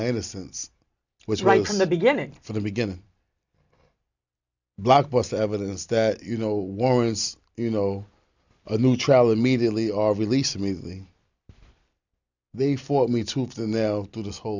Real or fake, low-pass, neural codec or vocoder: real; 7.2 kHz; none